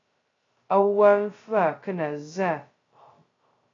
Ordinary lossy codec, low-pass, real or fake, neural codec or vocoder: AAC, 32 kbps; 7.2 kHz; fake; codec, 16 kHz, 0.2 kbps, FocalCodec